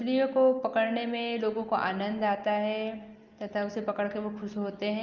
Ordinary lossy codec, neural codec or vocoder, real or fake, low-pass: Opus, 24 kbps; none; real; 7.2 kHz